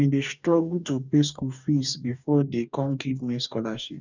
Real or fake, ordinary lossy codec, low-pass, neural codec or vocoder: fake; none; 7.2 kHz; codec, 44.1 kHz, 2.6 kbps, DAC